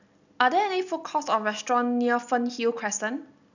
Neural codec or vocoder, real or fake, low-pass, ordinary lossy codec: none; real; 7.2 kHz; none